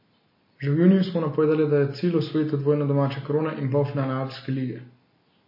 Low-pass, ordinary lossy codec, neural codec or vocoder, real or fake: 5.4 kHz; MP3, 24 kbps; none; real